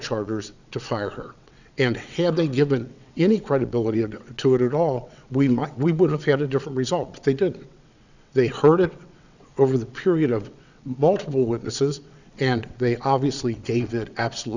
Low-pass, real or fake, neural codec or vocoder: 7.2 kHz; fake; vocoder, 22.05 kHz, 80 mel bands, WaveNeXt